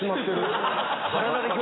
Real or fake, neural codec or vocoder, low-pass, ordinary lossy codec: real; none; 7.2 kHz; AAC, 16 kbps